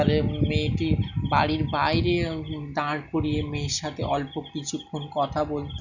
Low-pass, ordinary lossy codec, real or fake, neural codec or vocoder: 7.2 kHz; none; real; none